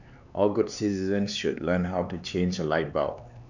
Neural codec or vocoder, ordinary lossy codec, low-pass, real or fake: codec, 16 kHz, 4 kbps, X-Codec, HuBERT features, trained on LibriSpeech; none; 7.2 kHz; fake